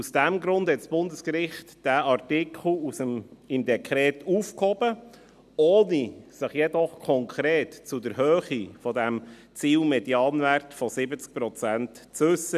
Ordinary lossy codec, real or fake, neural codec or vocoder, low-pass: none; real; none; 14.4 kHz